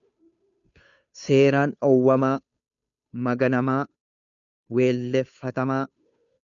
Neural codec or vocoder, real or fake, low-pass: codec, 16 kHz, 2 kbps, FunCodec, trained on Chinese and English, 25 frames a second; fake; 7.2 kHz